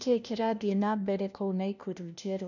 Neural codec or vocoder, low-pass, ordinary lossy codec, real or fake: codec, 16 kHz, 1 kbps, FunCodec, trained on LibriTTS, 50 frames a second; 7.2 kHz; none; fake